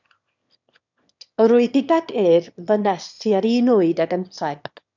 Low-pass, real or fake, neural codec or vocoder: 7.2 kHz; fake; autoencoder, 22.05 kHz, a latent of 192 numbers a frame, VITS, trained on one speaker